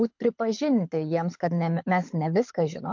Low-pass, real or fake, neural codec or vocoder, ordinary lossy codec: 7.2 kHz; fake; codec, 24 kHz, 6 kbps, HILCodec; MP3, 48 kbps